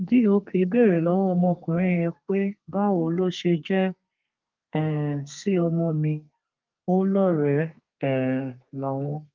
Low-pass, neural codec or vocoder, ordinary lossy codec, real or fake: 7.2 kHz; codec, 32 kHz, 1.9 kbps, SNAC; Opus, 32 kbps; fake